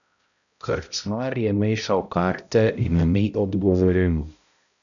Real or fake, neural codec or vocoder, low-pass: fake; codec, 16 kHz, 0.5 kbps, X-Codec, HuBERT features, trained on balanced general audio; 7.2 kHz